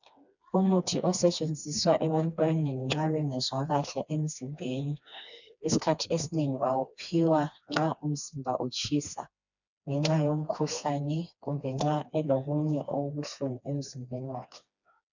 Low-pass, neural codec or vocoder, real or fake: 7.2 kHz; codec, 16 kHz, 2 kbps, FreqCodec, smaller model; fake